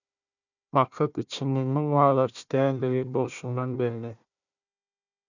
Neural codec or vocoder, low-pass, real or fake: codec, 16 kHz, 1 kbps, FunCodec, trained on Chinese and English, 50 frames a second; 7.2 kHz; fake